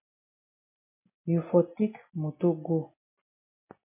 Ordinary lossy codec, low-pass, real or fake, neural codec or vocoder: MP3, 24 kbps; 3.6 kHz; real; none